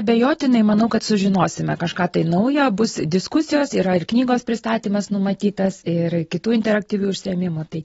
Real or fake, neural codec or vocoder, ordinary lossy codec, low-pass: real; none; AAC, 24 kbps; 10.8 kHz